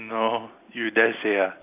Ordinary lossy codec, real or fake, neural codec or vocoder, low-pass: AAC, 32 kbps; real; none; 3.6 kHz